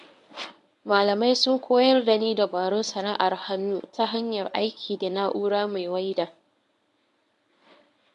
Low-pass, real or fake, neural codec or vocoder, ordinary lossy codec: 10.8 kHz; fake; codec, 24 kHz, 0.9 kbps, WavTokenizer, medium speech release version 1; MP3, 96 kbps